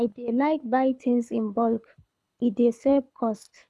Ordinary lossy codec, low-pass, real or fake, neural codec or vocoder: none; none; fake; codec, 24 kHz, 6 kbps, HILCodec